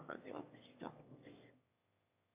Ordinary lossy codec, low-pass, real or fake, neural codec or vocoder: AAC, 32 kbps; 3.6 kHz; fake; autoencoder, 22.05 kHz, a latent of 192 numbers a frame, VITS, trained on one speaker